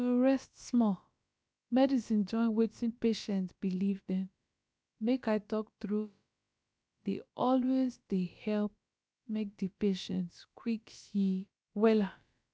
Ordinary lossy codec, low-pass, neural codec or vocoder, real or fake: none; none; codec, 16 kHz, about 1 kbps, DyCAST, with the encoder's durations; fake